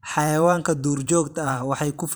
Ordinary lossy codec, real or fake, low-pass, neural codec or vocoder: none; fake; none; vocoder, 44.1 kHz, 128 mel bands every 512 samples, BigVGAN v2